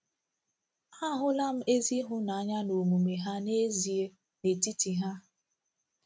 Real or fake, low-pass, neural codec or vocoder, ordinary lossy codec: real; none; none; none